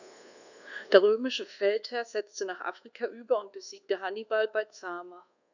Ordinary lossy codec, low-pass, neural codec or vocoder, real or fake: none; 7.2 kHz; codec, 24 kHz, 1.2 kbps, DualCodec; fake